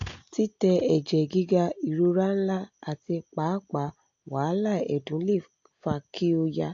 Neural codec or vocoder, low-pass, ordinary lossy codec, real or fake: none; 7.2 kHz; AAC, 48 kbps; real